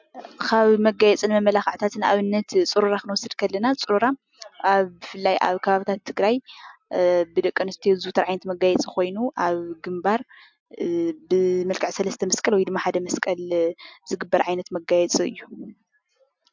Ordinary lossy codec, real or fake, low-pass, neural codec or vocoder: MP3, 64 kbps; real; 7.2 kHz; none